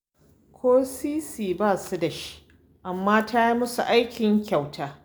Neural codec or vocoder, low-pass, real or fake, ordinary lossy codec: none; none; real; none